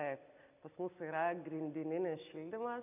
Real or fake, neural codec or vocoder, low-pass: real; none; 3.6 kHz